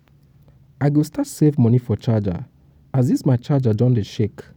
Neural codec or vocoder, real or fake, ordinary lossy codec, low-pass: vocoder, 44.1 kHz, 128 mel bands every 256 samples, BigVGAN v2; fake; none; 19.8 kHz